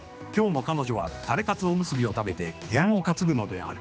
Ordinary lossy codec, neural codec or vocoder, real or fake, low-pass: none; codec, 16 kHz, 2 kbps, X-Codec, HuBERT features, trained on general audio; fake; none